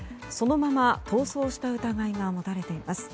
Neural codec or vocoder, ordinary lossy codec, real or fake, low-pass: none; none; real; none